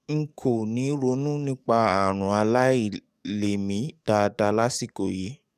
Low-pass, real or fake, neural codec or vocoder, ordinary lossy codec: 14.4 kHz; fake; codec, 44.1 kHz, 7.8 kbps, DAC; none